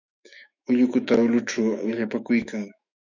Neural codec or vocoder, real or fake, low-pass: autoencoder, 48 kHz, 128 numbers a frame, DAC-VAE, trained on Japanese speech; fake; 7.2 kHz